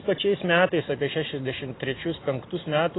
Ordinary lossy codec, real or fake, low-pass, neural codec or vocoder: AAC, 16 kbps; fake; 7.2 kHz; vocoder, 44.1 kHz, 128 mel bands every 256 samples, BigVGAN v2